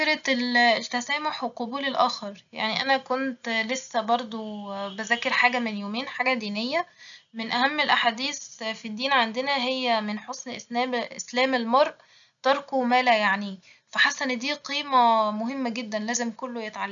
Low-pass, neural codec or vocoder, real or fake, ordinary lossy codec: 7.2 kHz; none; real; none